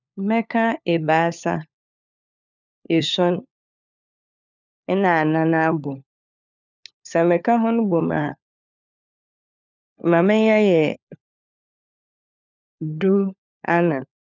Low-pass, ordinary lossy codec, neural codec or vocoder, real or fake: 7.2 kHz; none; codec, 16 kHz, 4 kbps, FunCodec, trained on LibriTTS, 50 frames a second; fake